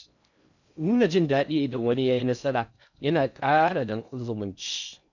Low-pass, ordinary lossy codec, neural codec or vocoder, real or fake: 7.2 kHz; none; codec, 16 kHz in and 24 kHz out, 0.6 kbps, FocalCodec, streaming, 4096 codes; fake